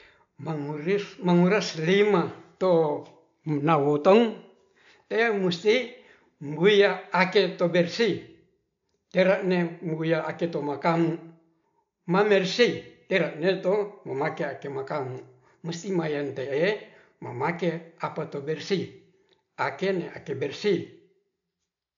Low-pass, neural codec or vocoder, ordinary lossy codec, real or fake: 7.2 kHz; none; MP3, 64 kbps; real